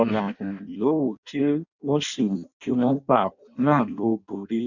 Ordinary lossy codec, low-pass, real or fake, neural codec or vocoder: none; 7.2 kHz; fake; codec, 16 kHz in and 24 kHz out, 0.6 kbps, FireRedTTS-2 codec